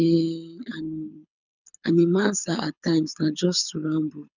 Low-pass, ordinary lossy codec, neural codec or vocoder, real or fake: 7.2 kHz; none; codec, 24 kHz, 6 kbps, HILCodec; fake